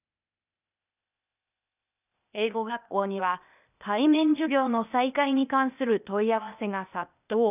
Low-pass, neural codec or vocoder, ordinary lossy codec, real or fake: 3.6 kHz; codec, 16 kHz, 0.8 kbps, ZipCodec; none; fake